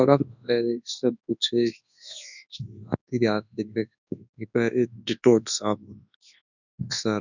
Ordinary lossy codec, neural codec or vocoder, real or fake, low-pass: none; codec, 24 kHz, 0.9 kbps, WavTokenizer, large speech release; fake; 7.2 kHz